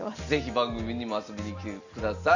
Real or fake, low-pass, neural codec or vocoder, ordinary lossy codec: real; 7.2 kHz; none; none